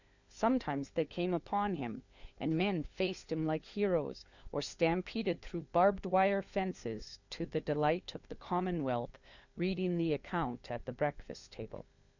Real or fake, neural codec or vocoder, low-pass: fake; codec, 16 kHz, 4 kbps, FunCodec, trained on LibriTTS, 50 frames a second; 7.2 kHz